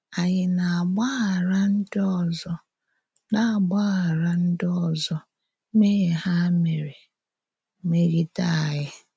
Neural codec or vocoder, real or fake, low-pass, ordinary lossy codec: none; real; none; none